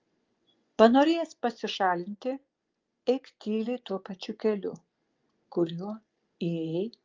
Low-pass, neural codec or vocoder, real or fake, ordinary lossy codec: 7.2 kHz; vocoder, 24 kHz, 100 mel bands, Vocos; fake; Opus, 32 kbps